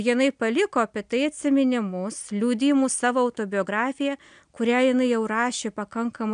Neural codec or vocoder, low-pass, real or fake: none; 9.9 kHz; real